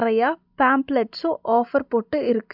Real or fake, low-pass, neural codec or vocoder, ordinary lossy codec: real; 5.4 kHz; none; none